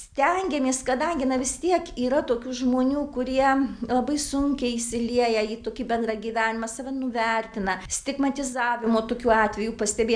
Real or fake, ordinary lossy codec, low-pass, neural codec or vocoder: real; MP3, 96 kbps; 9.9 kHz; none